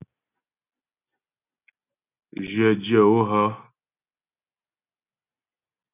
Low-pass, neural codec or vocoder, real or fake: 3.6 kHz; none; real